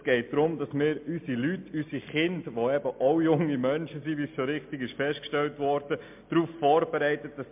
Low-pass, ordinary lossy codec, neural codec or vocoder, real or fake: 3.6 kHz; MP3, 32 kbps; none; real